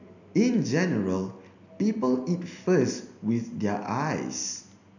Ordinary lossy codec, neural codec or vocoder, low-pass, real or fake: none; none; 7.2 kHz; real